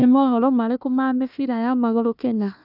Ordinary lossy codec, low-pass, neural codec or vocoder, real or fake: none; 5.4 kHz; codec, 16 kHz, 1 kbps, FunCodec, trained on Chinese and English, 50 frames a second; fake